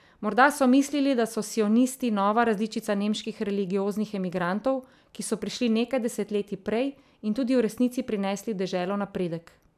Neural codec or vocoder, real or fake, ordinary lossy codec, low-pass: none; real; none; 14.4 kHz